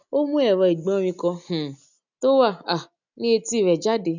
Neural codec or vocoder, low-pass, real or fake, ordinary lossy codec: none; 7.2 kHz; real; none